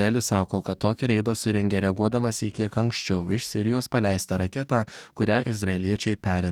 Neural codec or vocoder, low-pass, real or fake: codec, 44.1 kHz, 2.6 kbps, DAC; 19.8 kHz; fake